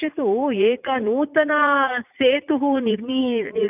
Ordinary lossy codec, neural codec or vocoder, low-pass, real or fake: none; vocoder, 44.1 kHz, 80 mel bands, Vocos; 3.6 kHz; fake